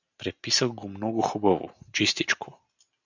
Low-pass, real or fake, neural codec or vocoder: 7.2 kHz; real; none